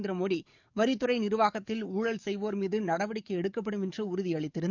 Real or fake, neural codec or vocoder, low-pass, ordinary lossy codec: fake; codec, 44.1 kHz, 7.8 kbps, DAC; 7.2 kHz; none